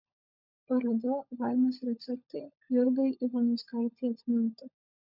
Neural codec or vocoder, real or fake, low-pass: codec, 16 kHz, 16 kbps, FunCodec, trained on LibriTTS, 50 frames a second; fake; 5.4 kHz